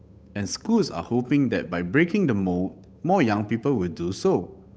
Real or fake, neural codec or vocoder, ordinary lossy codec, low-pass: fake; codec, 16 kHz, 8 kbps, FunCodec, trained on Chinese and English, 25 frames a second; none; none